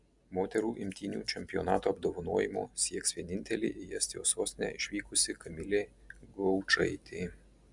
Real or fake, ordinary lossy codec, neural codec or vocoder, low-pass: real; Opus, 64 kbps; none; 10.8 kHz